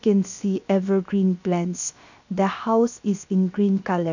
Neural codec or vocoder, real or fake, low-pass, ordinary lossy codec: codec, 16 kHz, 0.7 kbps, FocalCodec; fake; 7.2 kHz; none